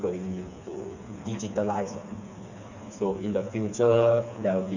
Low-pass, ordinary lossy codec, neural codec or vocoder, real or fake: 7.2 kHz; none; codec, 16 kHz, 4 kbps, FreqCodec, smaller model; fake